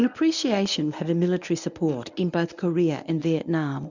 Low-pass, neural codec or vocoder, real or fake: 7.2 kHz; codec, 24 kHz, 0.9 kbps, WavTokenizer, medium speech release version 1; fake